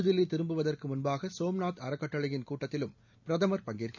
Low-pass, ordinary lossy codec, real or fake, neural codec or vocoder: 7.2 kHz; none; real; none